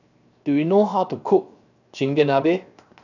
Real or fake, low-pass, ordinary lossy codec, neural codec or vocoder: fake; 7.2 kHz; none; codec, 16 kHz, 0.7 kbps, FocalCodec